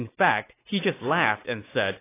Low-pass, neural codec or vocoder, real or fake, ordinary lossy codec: 3.6 kHz; none; real; AAC, 24 kbps